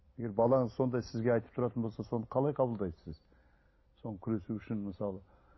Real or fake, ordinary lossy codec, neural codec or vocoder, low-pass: real; MP3, 24 kbps; none; 7.2 kHz